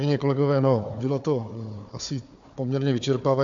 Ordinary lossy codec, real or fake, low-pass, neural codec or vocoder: AAC, 64 kbps; fake; 7.2 kHz; codec, 16 kHz, 4 kbps, FunCodec, trained on Chinese and English, 50 frames a second